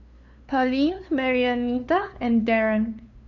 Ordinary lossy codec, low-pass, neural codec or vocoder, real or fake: none; 7.2 kHz; codec, 16 kHz, 2 kbps, FunCodec, trained on LibriTTS, 25 frames a second; fake